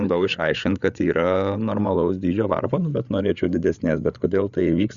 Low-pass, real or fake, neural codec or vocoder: 7.2 kHz; fake; codec, 16 kHz, 8 kbps, FreqCodec, larger model